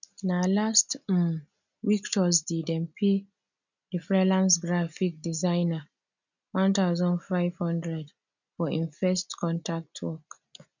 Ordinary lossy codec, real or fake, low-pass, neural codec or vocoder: none; real; 7.2 kHz; none